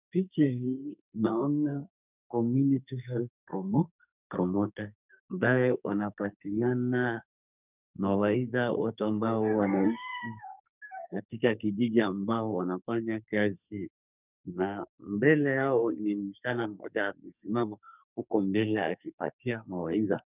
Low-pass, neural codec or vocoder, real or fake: 3.6 kHz; codec, 44.1 kHz, 2.6 kbps, SNAC; fake